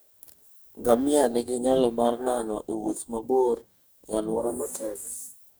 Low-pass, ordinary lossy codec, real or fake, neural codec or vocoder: none; none; fake; codec, 44.1 kHz, 2.6 kbps, DAC